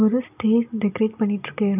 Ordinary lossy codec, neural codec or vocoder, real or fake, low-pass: none; none; real; 3.6 kHz